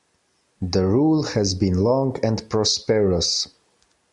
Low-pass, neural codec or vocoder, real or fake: 10.8 kHz; none; real